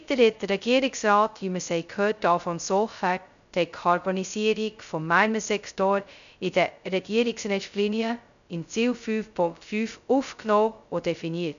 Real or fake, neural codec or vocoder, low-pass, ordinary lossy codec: fake; codec, 16 kHz, 0.2 kbps, FocalCodec; 7.2 kHz; none